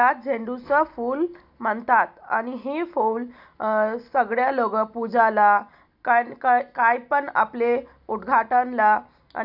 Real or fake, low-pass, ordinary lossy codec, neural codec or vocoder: real; 5.4 kHz; none; none